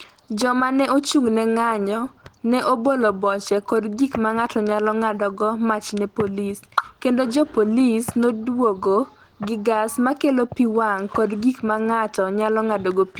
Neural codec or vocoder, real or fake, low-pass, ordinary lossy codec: none; real; 19.8 kHz; Opus, 16 kbps